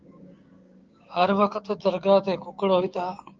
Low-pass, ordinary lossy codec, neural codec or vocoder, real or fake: 7.2 kHz; Opus, 24 kbps; codec, 16 kHz, 6 kbps, DAC; fake